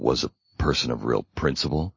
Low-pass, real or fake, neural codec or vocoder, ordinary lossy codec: 7.2 kHz; real; none; MP3, 32 kbps